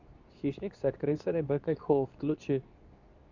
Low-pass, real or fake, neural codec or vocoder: 7.2 kHz; fake; codec, 24 kHz, 0.9 kbps, WavTokenizer, medium speech release version 2